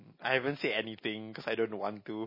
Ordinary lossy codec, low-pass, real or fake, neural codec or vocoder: MP3, 24 kbps; 5.4 kHz; real; none